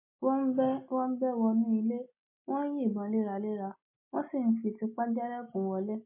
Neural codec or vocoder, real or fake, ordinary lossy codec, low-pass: none; real; MP3, 24 kbps; 3.6 kHz